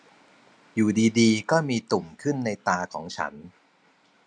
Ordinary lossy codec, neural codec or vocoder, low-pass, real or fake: none; none; none; real